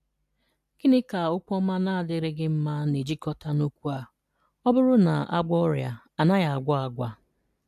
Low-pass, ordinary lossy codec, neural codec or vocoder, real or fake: 14.4 kHz; AAC, 96 kbps; none; real